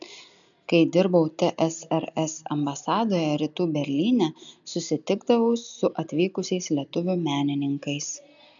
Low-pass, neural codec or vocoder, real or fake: 7.2 kHz; none; real